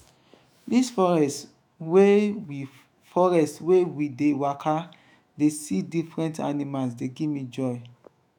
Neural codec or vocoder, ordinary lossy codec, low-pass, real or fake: autoencoder, 48 kHz, 128 numbers a frame, DAC-VAE, trained on Japanese speech; none; 19.8 kHz; fake